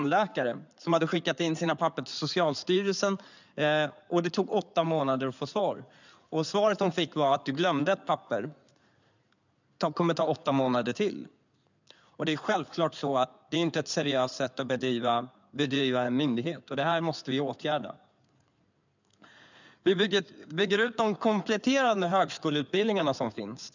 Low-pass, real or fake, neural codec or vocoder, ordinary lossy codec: 7.2 kHz; fake; codec, 16 kHz in and 24 kHz out, 2.2 kbps, FireRedTTS-2 codec; none